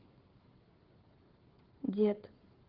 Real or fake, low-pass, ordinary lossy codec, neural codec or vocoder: fake; 5.4 kHz; Opus, 16 kbps; codec, 16 kHz, 16 kbps, FreqCodec, smaller model